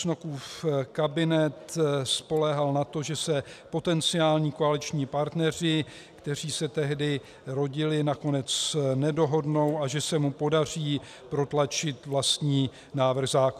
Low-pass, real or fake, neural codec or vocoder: 14.4 kHz; real; none